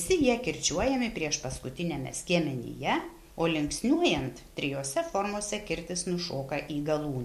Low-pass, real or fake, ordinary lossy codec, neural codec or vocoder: 14.4 kHz; real; MP3, 64 kbps; none